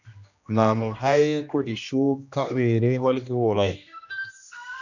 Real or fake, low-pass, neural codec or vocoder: fake; 7.2 kHz; codec, 16 kHz, 1 kbps, X-Codec, HuBERT features, trained on general audio